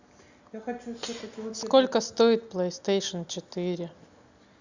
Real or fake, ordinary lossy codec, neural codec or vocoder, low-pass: real; Opus, 64 kbps; none; 7.2 kHz